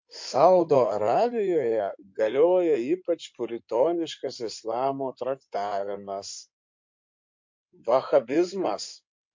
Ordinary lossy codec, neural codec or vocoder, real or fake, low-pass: MP3, 48 kbps; codec, 16 kHz in and 24 kHz out, 2.2 kbps, FireRedTTS-2 codec; fake; 7.2 kHz